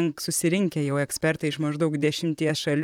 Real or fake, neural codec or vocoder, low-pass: fake; vocoder, 44.1 kHz, 128 mel bands, Pupu-Vocoder; 19.8 kHz